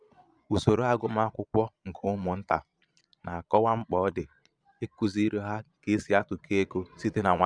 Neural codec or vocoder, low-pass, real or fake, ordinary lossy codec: vocoder, 22.05 kHz, 80 mel bands, Vocos; 9.9 kHz; fake; none